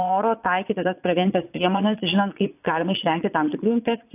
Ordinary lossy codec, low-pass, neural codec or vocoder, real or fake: AAC, 32 kbps; 3.6 kHz; vocoder, 22.05 kHz, 80 mel bands, Vocos; fake